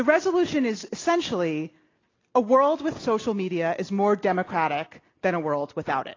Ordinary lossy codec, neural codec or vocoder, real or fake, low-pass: AAC, 32 kbps; none; real; 7.2 kHz